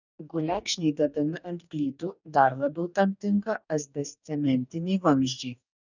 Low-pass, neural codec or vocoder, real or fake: 7.2 kHz; codec, 44.1 kHz, 2.6 kbps, DAC; fake